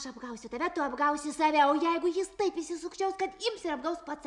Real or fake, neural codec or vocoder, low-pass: real; none; 10.8 kHz